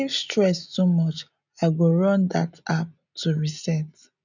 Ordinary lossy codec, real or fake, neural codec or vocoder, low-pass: none; real; none; 7.2 kHz